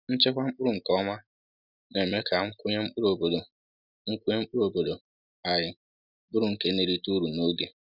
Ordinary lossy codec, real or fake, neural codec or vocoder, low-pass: none; real; none; 5.4 kHz